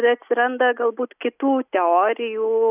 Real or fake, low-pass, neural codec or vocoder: real; 3.6 kHz; none